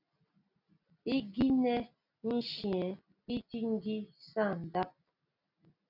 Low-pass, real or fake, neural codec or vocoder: 5.4 kHz; real; none